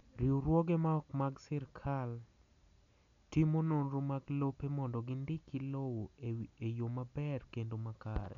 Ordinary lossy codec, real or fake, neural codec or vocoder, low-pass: MP3, 64 kbps; real; none; 7.2 kHz